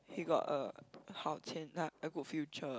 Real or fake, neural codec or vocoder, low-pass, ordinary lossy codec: real; none; none; none